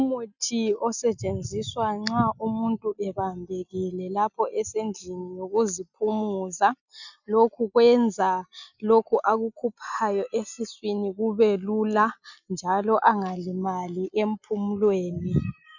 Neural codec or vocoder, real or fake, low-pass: none; real; 7.2 kHz